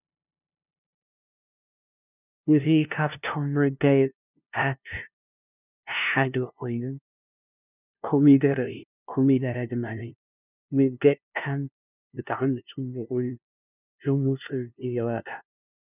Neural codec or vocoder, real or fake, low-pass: codec, 16 kHz, 0.5 kbps, FunCodec, trained on LibriTTS, 25 frames a second; fake; 3.6 kHz